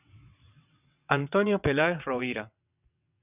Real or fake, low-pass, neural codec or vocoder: fake; 3.6 kHz; vocoder, 22.05 kHz, 80 mel bands, WaveNeXt